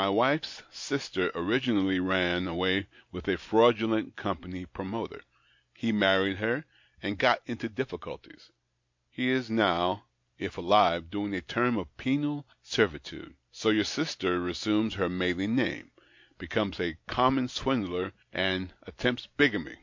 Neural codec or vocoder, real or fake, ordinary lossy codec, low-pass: none; real; MP3, 48 kbps; 7.2 kHz